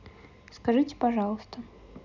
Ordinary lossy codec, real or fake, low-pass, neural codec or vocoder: none; real; 7.2 kHz; none